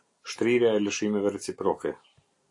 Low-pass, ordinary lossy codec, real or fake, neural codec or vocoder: 10.8 kHz; AAC, 48 kbps; real; none